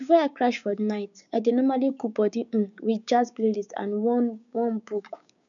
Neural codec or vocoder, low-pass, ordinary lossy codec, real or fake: codec, 16 kHz, 6 kbps, DAC; 7.2 kHz; none; fake